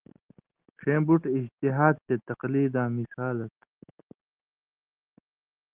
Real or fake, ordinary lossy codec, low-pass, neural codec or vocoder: real; Opus, 16 kbps; 3.6 kHz; none